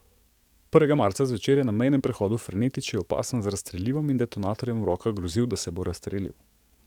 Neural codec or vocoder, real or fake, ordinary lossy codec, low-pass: codec, 44.1 kHz, 7.8 kbps, DAC; fake; none; 19.8 kHz